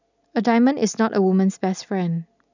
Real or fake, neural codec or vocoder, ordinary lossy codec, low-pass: real; none; none; 7.2 kHz